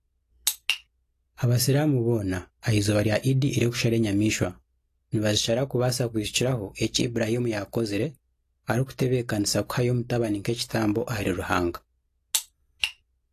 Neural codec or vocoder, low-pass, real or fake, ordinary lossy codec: none; 14.4 kHz; real; AAC, 48 kbps